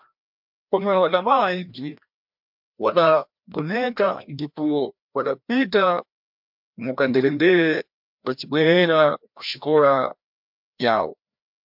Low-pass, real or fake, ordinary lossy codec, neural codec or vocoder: 5.4 kHz; fake; MP3, 48 kbps; codec, 16 kHz, 1 kbps, FreqCodec, larger model